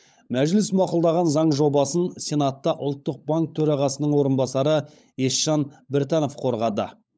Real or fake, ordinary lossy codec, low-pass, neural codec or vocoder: fake; none; none; codec, 16 kHz, 16 kbps, FunCodec, trained on LibriTTS, 50 frames a second